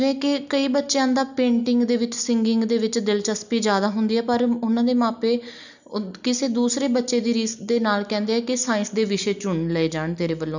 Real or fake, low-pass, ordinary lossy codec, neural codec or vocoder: real; 7.2 kHz; none; none